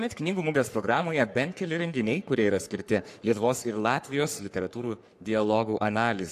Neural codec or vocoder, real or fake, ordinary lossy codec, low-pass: codec, 44.1 kHz, 3.4 kbps, Pupu-Codec; fake; AAC, 64 kbps; 14.4 kHz